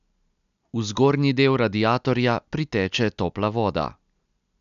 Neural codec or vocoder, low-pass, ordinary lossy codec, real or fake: none; 7.2 kHz; MP3, 96 kbps; real